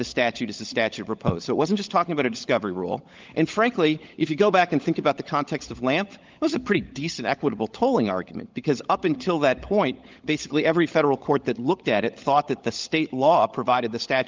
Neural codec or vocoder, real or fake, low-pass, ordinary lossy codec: codec, 16 kHz, 16 kbps, FunCodec, trained on LibriTTS, 50 frames a second; fake; 7.2 kHz; Opus, 32 kbps